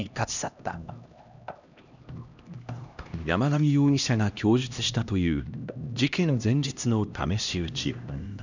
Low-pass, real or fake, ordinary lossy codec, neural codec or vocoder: 7.2 kHz; fake; none; codec, 16 kHz, 1 kbps, X-Codec, HuBERT features, trained on LibriSpeech